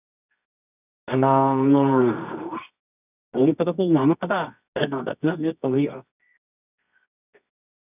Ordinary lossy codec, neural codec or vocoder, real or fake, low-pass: none; codec, 24 kHz, 0.9 kbps, WavTokenizer, medium music audio release; fake; 3.6 kHz